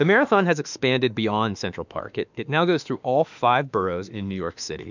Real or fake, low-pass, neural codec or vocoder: fake; 7.2 kHz; autoencoder, 48 kHz, 32 numbers a frame, DAC-VAE, trained on Japanese speech